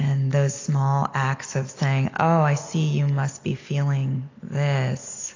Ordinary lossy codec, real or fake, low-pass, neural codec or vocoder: AAC, 32 kbps; real; 7.2 kHz; none